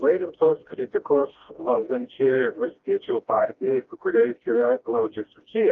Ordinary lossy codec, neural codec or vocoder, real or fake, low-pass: Opus, 32 kbps; codec, 16 kHz, 1 kbps, FreqCodec, smaller model; fake; 7.2 kHz